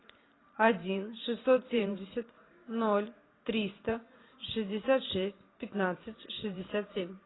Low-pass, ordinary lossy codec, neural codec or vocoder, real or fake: 7.2 kHz; AAC, 16 kbps; vocoder, 44.1 kHz, 128 mel bands every 512 samples, BigVGAN v2; fake